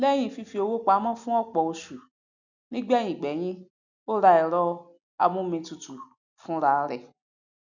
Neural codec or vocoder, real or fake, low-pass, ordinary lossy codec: none; real; 7.2 kHz; none